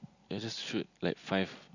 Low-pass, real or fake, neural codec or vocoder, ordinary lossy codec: 7.2 kHz; real; none; none